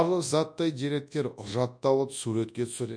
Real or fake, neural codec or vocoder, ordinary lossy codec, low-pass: fake; codec, 24 kHz, 0.9 kbps, WavTokenizer, large speech release; MP3, 64 kbps; 9.9 kHz